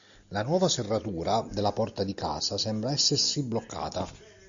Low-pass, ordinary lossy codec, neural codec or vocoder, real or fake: 7.2 kHz; Opus, 64 kbps; none; real